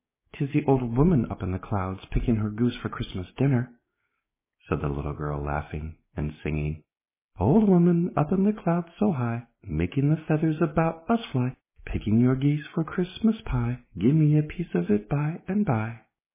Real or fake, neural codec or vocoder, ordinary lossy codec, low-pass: fake; codec, 16 kHz, 6 kbps, DAC; MP3, 16 kbps; 3.6 kHz